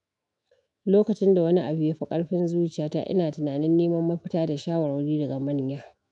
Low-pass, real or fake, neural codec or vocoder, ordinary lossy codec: 10.8 kHz; fake; autoencoder, 48 kHz, 128 numbers a frame, DAC-VAE, trained on Japanese speech; none